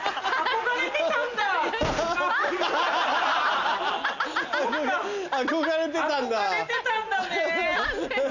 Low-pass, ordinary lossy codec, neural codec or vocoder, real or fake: 7.2 kHz; none; none; real